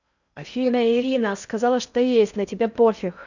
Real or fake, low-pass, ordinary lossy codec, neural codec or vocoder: fake; 7.2 kHz; none; codec, 16 kHz in and 24 kHz out, 0.6 kbps, FocalCodec, streaming, 4096 codes